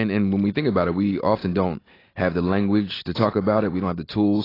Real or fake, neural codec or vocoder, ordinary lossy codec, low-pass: real; none; AAC, 24 kbps; 5.4 kHz